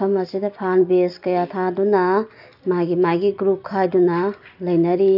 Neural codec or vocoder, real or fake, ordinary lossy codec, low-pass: none; real; none; 5.4 kHz